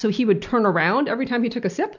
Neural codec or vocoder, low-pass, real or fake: none; 7.2 kHz; real